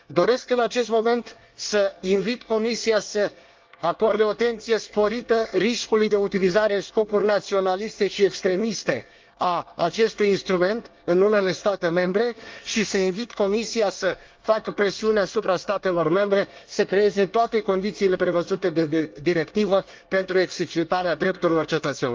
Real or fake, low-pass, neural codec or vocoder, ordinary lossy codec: fake; 7.2 kHz; codec, 24 kHz, 1 kbps, SNAC; Opus, 24 kbps